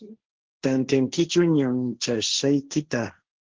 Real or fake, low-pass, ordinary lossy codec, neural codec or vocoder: fake; 7.2 kHz; Opus, 16 kbps; codec, 16 kHz, 1.1 kbps, Voila-Tokenizer